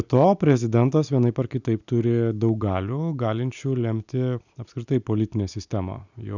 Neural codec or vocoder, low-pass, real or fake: none; 7.2 kHz; real